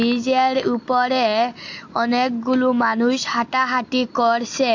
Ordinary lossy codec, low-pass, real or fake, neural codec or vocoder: AAC, 48 kbps; 7.2 kHz; real; none